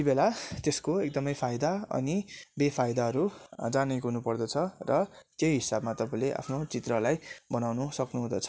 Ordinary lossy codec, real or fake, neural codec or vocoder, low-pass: none; real; none; none